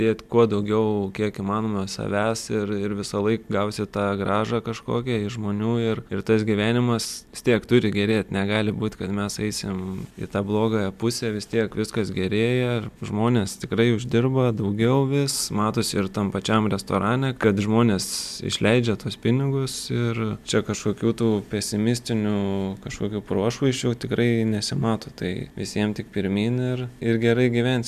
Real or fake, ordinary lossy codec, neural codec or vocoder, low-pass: real; MP3, 96 kbps; none; 14.4 kHz